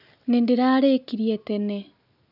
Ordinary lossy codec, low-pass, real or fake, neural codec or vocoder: none; 5.4 kHz; real; none